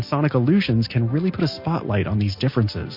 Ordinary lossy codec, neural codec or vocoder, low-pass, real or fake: MP3, 32 kbps; none; 5.4 kHz; real